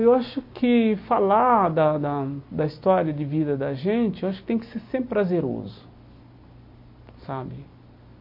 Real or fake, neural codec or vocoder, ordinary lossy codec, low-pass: real; none; MP3, 32 kbps; 5.4 kHz